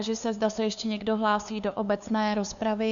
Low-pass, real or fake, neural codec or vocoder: 7.2 kHz; fake; codec, 16 kHz, 2 kbps, FunCodec, trained on LibriTTS, 25 frames a second